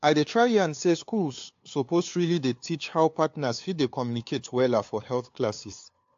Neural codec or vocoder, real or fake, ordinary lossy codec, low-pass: codec, 16 kHz, 2 kbps, FunCodec, trained on LibriTTS, 25 frames a second; fake; AAC, 48 kbps; 7.2 kHz